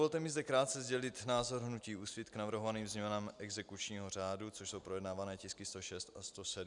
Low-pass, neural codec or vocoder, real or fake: 10.8 kHz; none; real